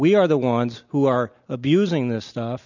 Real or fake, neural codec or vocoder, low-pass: real; none; 7.2 kHz